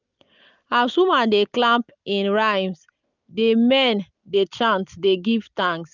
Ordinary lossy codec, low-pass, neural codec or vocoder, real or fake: none; 7.2 kHz; none; real